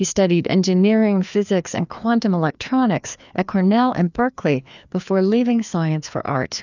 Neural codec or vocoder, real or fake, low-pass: codec, 16 kHz, 2 kbps, FreqCodec, larger model; fake; 7.2 kHz